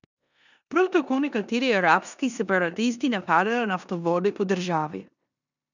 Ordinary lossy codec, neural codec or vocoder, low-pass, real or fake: none; codec, 16 kHz in and 24 kHz out, 0.9 kbps, LongCat-Audio-Codec, four codebook decoder; 7.2 kHz; fake